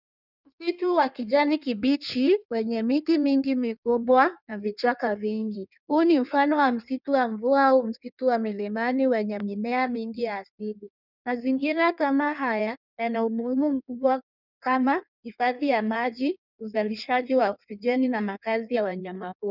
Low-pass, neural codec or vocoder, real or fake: 5.4 kHz; codec, 16 kHz in and 24 kHz out, 1.1 kbps, FireRedTTS-2 codec; fake